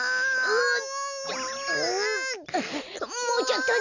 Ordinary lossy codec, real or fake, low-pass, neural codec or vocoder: none; real; 7.2 kHz; none